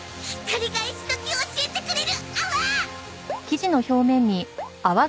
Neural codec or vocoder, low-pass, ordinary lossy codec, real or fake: none; none; none; real